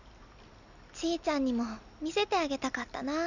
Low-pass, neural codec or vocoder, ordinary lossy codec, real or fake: 7.2 kHz; none; none; real